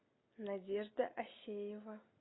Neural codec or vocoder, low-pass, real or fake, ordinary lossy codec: none; 7.2 kHz; real; AAC, 16 kbps